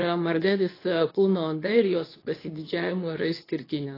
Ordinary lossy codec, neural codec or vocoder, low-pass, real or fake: AAC, 24 kbps; codec, 24 kHz, 0.9 kbps, WavTokenizer, medium speech release version 2; 5.4 kHz; fake